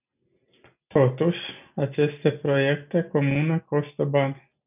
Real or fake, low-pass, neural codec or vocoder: real; 3.6 kHz; none